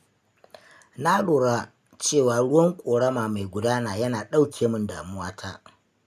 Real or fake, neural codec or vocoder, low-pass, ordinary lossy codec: real; none; 14.4 kHz; none